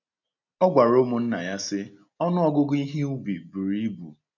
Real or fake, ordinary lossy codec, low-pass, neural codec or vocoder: real; none; 7.2 kHz; none